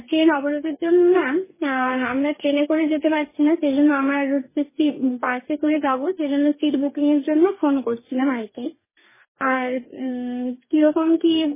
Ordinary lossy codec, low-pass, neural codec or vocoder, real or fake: MP3, 16 kbps; 3.6 kHz; codec, 44.1 kHz, 2.6 kbps, DAC; fake